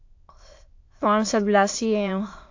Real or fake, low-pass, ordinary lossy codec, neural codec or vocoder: fake; 7.2 kHz; AAC, 48 kbps; autoencoder, 22.05 kHz, a latent of 192 numbers a frame, VITS, trained on many speakers